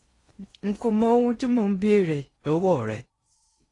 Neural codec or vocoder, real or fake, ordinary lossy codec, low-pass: codec, 16 kHz in and 24 kHz out, 0.6 kbps, FocalCodec, streaming, 4096 codes; fake; AAC, 32 kbps; 10.8 kHz